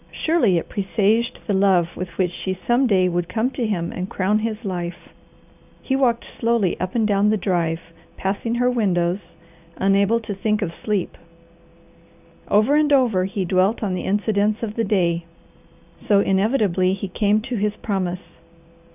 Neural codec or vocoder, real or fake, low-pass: none; real; 3.6 kHz